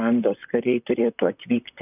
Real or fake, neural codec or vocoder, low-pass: fake; vocoder, 44.1 kHz, 128 mel bands, Pupu-Vocoder; 3.6 kHz